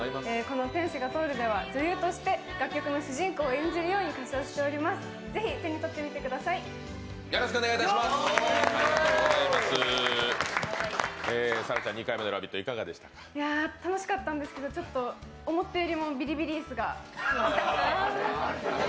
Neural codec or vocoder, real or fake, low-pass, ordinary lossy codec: none; real; none; none